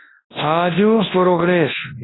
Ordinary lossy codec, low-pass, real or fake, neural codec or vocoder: AAC, 16 kbps; 7.2 kHz; fake; codec, 24 kHz, 0.9 kbps, WavTokenizer, large speech release